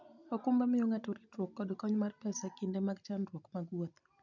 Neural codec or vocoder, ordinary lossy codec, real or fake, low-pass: none; none; real; 7.2 kHz